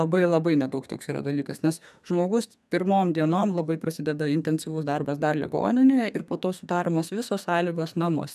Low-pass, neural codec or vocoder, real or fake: 14.4 kHz; codec, 32 kHz, 1.9 kbps, SNAC; fake